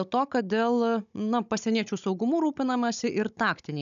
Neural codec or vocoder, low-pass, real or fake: codec, 16 kHz, 16 kbps, FunCodec, trained on Chinese and English, 50 frames a second; 7.2 kHz; fake